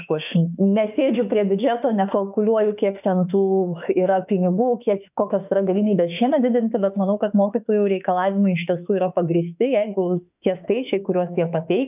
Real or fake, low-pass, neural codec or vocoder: fake; 3.6 kHz; autoencoder, 48 kHz, 32 numbers a frame, DAC-VAE, trained on Japanese speech